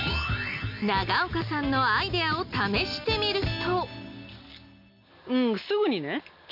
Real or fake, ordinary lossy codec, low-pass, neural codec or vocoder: real; none; 5.4 kHz; none